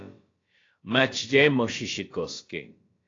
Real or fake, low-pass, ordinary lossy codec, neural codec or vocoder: fake; 7.2 kHz; AAC, 32 kbps; codec, 16 kHz, about 1 kbps, DyCAST, with the encoder's durations